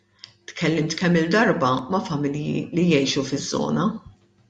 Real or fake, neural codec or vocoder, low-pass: real; none; 9.9 kHz